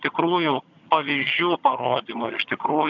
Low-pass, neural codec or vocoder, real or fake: 7.2 kHz; vocoder, 22.05 kHz, 80 mel bands, HiFi-GAN; fake